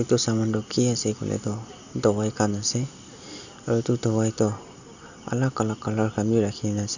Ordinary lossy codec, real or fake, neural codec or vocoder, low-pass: none; real; none; 7.2 kHz